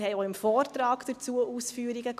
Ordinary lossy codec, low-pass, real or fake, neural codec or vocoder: none; 14.4 kHz; real; none